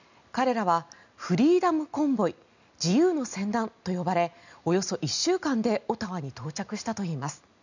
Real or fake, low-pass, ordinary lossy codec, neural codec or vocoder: real; 7.2 kHz; none; none